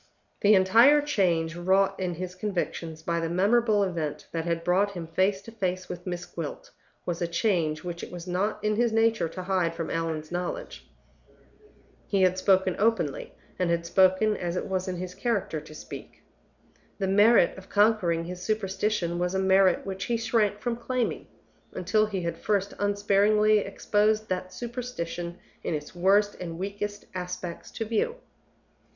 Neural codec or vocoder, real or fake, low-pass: none; real; 7.2 kHz